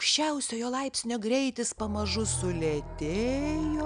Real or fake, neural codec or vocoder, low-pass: real; none; 9.9 kHz